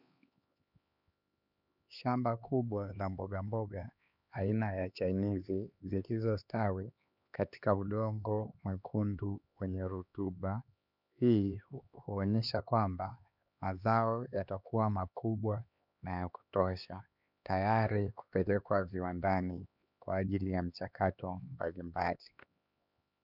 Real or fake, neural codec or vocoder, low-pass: fake; codec, 16 kHz, 2 kbps, X-Codec, HuBERT features, trained on LibriSpeech; 5.4 kHz